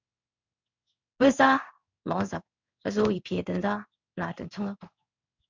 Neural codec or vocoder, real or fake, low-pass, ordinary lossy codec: codec, 16 kHz in and 24 kHz out, 1 kbps, XY-Tokenizer; fake; 7.2 kHz; MP3, 64 kbps